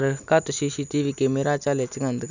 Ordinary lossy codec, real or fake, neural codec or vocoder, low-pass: none; real; none; 7.2 kHz